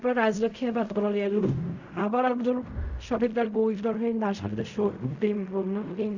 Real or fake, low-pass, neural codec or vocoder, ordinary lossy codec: fake; 7.2 kHz; codec, 16 kHz in and 24 kHz out, 0.4 kbps, LongCat-Audio-Codec, fine tuned four codebook decoder; none